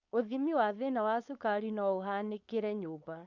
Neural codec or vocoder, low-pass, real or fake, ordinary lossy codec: codec, 16 kHz, 4.8 kbps, FACodec; 7.2 kHz; fake; Opus, 64 kbps